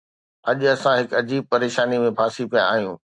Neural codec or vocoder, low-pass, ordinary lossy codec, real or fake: none; 10.8 kHz; AAC, 64 kbps; real